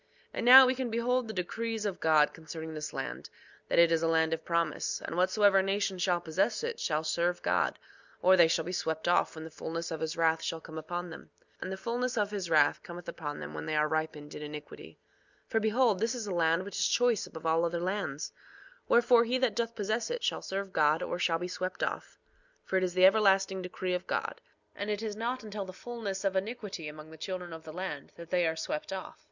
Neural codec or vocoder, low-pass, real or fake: none; 7.2 kHz; real